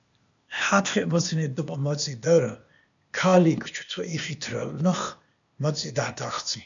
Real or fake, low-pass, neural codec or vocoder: fake; 7.2 kHz; codec, 16 kHz, 0.8 kbps, ZipCodec